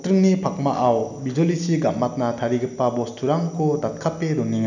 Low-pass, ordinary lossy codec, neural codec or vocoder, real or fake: 7.2 kHz; none; none; real